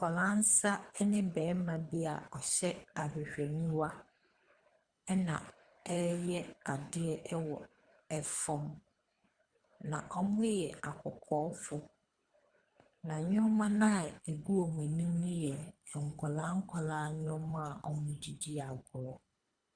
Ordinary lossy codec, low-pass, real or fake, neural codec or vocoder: Opus, 64 kbps; 9.9 kHz; fake; codec, 24 kHz, 3 kbps, HILCodec